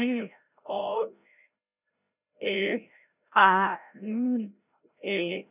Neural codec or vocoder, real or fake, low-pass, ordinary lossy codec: codec, 16 kHz, 0.5 kbps, FreqCodec, larger model; fake; 3.6 kHz; none